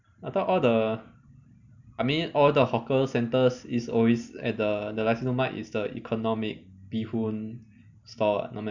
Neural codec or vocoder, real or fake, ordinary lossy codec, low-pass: none; real; none; 7.2 kHz